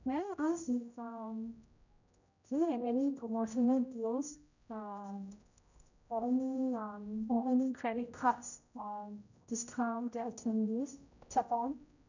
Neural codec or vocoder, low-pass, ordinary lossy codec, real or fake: codec, 16 kHz, 0.5 kbps, X-Codec, HuBERT features, trained on general audio; 7.2 kHz; none; fake